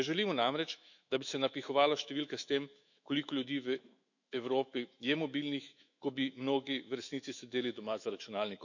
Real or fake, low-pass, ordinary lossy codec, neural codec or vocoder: fake; 7.2 kHz; none; autoencoder, 48 kHz, 128 numbers a frame, DAC-VAE, trained on Japanese speech